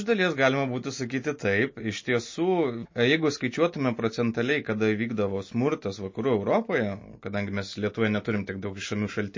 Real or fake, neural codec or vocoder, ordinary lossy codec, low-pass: real; none; MP3, 32 kbps; 7.2 kHz